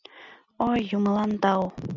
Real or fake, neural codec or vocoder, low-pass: real; none; 7.2 kHz